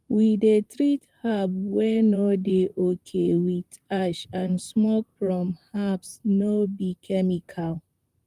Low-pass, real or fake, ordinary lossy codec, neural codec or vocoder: 14.4 kHz; fake; Opus, 32 kbps; vocoder, 44.1 kHz, 128 mel bands, Pupu-Vocoder